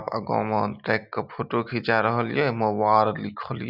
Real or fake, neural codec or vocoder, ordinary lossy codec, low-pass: real; none; none; 5.4 kHz